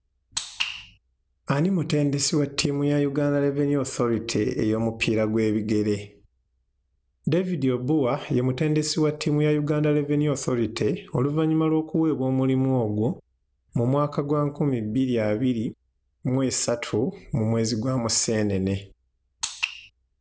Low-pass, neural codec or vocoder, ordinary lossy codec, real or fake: none; none; none; real